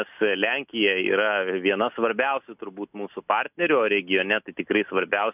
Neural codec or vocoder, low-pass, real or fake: none; 3.6 kHz; real